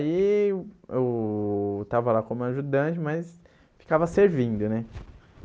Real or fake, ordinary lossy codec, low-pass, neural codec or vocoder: real; none; none; none